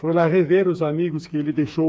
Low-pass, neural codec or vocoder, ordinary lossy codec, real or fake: none; codec, 16 kHz, 4 kbps, FreqCodec, smaller model; none; fake